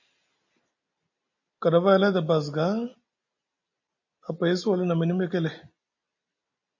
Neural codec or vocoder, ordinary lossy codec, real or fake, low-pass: none; MP3, 32 kbps; real; 7.2 kHz